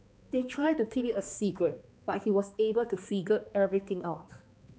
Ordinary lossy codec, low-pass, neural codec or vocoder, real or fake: none; none; codec, 16 kHz, 2 kbps, X-Codec, HuBERT features, trained on balanced general audio; fake